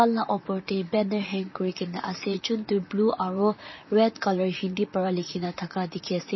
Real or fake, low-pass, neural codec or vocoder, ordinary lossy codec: fake; 7.2 kHz; vocoder, 44.1 kHz, 128 mel bands, Pupu-Vocoder; MP3, 24 kbps